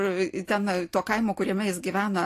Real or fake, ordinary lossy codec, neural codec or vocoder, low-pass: real; AAC, 48 kbps; none; 14.4 kHz